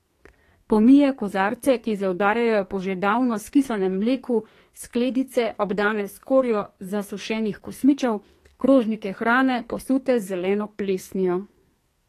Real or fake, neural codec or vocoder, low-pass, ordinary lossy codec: fake; codec, 44.1 kHz, 2.6 kbps, SNAC; 14.4 kHz; AAC, 48 kbps